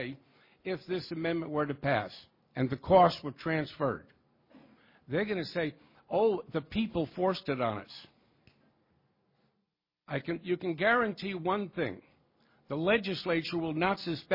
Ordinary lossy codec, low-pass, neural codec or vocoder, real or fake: MP3, 24 kbps; 5.4 kHz; none; real